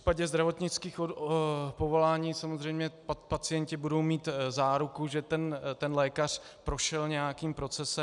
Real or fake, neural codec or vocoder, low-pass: real; none; 10.8 kHz